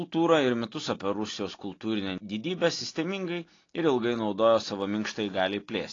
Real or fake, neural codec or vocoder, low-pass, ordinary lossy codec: real; none; 7.2 kHz; AAC, 32 kbps